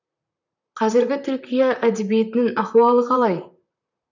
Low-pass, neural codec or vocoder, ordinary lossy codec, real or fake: 7.2 kHz; vocoder, 44.1 kHz, 128 mel bands, Pupu-Vocoder; none; fake